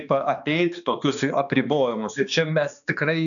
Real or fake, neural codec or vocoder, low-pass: fake; codec, 16 kHz, 2 kbps, X-Codec, HuBERT features, trained on balanced general audio; 7.2 kHz